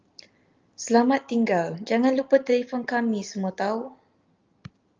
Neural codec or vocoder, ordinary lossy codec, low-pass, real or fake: none; Opus, 24 kbps; 7.2 kHz; real